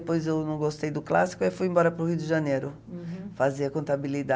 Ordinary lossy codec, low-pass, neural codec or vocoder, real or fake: none; none; none; real